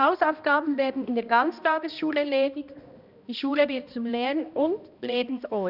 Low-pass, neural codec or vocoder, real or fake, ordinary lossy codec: 5.4 kHz; codec, 16 kHz, 1 kbps, X-Codec, HuBERT features, trained on balanced general audio; fake; none